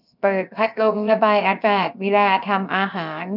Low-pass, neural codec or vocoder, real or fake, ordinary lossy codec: 5.4 kHz; codec, 16 kHz, 0.7 kbps, FocalCodec; fake; none